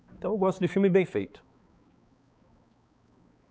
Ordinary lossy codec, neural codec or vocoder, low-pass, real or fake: none; codec, 16 kHz, 4 kbps, X-Codec, HuBERT features, trained on balanced general audio; none; fake